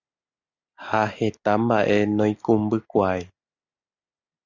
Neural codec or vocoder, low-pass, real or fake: none; 7.2 kHz; real